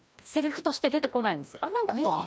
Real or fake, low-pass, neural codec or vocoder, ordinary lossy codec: fake; none; codec, 16 kHz, 1 kbps, FreqCodec, larger model; none